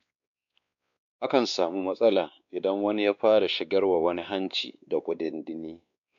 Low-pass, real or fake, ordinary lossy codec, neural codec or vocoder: 7.2 kHz; fake; none; codec, 16 kHz, 2 kbps, X-Codec, WavLM features, trained on Multilingual LibriSpeech